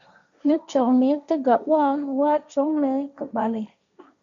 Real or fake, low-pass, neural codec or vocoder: fake; 7.2 kHz; codec, 16 kHz, 1.1 kbps, Voila-Tokenizer